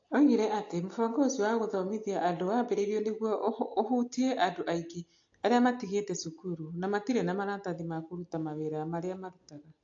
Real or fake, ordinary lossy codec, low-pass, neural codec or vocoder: real; AAC, 48 kbps; 7.2 kHz; none